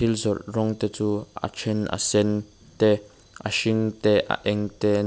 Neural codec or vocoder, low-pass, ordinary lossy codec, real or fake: none; none; none; real